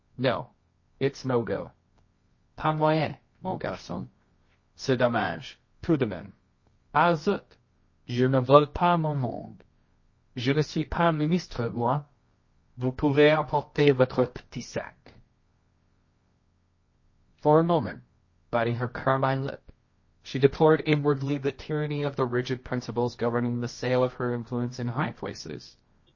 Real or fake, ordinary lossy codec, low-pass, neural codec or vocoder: fake; MP3, 32 kbps; 7.2 kHz; codec, 24 kHz, 0.9 kbps, WavTokenizer, medium music audio release